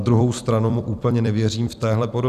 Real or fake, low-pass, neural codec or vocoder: fake; 14.4 kHz; vocoder, 44.1 kHz, 128 mel bands every 256 samples, BigVGAN v2